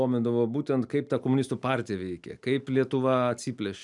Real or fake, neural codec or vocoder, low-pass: real; none; 10.8 kHz